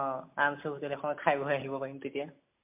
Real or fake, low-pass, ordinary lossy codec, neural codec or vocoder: real; 3.6 kHz; MP3, 32 kbps; none